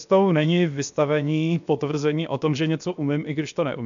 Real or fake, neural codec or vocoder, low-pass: fake; codec, 16 kHz, 0.7 kbps, FocalCodec; 7.2 kHz